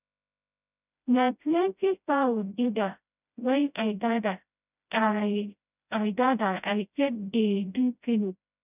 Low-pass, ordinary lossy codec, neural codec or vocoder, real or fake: 3.6 kHz; none; codec, 16 kHz, 0.5 kbps, FreqCodec, smaller model; fake